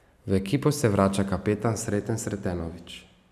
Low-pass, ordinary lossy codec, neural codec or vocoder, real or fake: 14.4 kHz; none; none; real